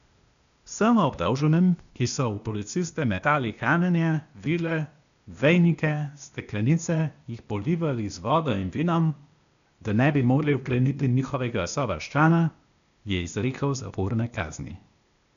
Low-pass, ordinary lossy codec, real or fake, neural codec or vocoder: 7.2 kHz; none; fake; codec, 16 kHz, 0.8 kbps, ZipCodec